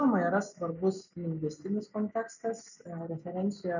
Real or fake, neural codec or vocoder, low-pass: real; none; 7.2 kHz